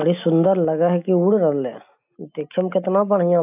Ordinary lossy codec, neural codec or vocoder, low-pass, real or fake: AAC, 32 kbps; none; 3.6 kHz; real